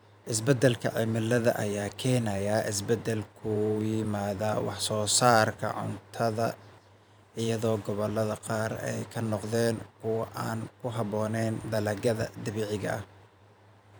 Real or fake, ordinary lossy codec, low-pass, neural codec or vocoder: fake; none; none; vocoder, 44.1 kHz, 128 mel bands every 512 samples, BigVGAN v2